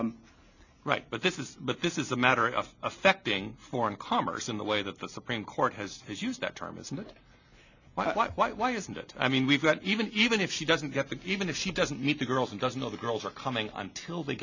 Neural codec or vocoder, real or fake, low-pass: none; real; 7.2 kHz